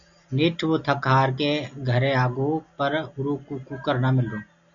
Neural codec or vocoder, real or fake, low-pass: none; real; 7.2 kHz